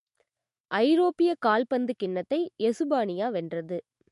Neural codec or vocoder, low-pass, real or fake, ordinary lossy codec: autoencoder, 48 kHz, 128 numbers a frame, DAC-VAE, trained on Japanese speech; 14.4 kHz; fake; MP3, 48 kbps